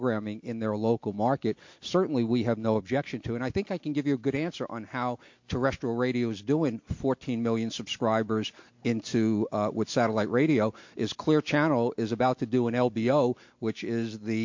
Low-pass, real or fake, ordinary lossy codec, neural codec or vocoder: 7.2 kHz; real; MP3, 48 kbps; none